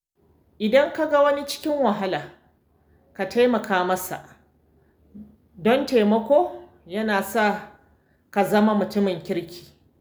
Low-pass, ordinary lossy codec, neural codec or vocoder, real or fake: none; none; none; real